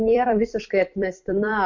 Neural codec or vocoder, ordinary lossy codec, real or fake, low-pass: vocoder, 44.1 kHz, 128 mel bands every 256 samples, BigVGAN v2; MP3, 48 kbps; fake; 7.2 kHz